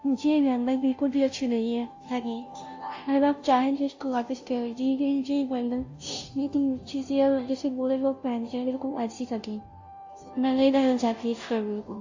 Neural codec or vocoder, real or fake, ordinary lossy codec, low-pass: codec, 16 kHz, 0.5 kbps, FunCodec, trained on Chinese and English, 25 frames a second; fake; AAC, 48 kbps; 7.2 kHz